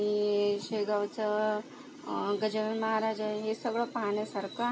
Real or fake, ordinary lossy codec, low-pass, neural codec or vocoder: real; none; none; none